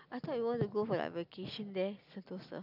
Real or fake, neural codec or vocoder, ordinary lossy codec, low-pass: real; none; none; 5.4 kHz